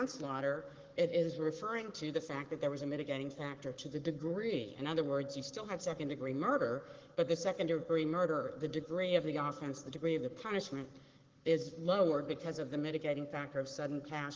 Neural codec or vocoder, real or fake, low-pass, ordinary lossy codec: codec, 44.1 kHz, 7.8 kbps, Pupu-Codec; fake; 7.2 kHz; Opus, 16 kbps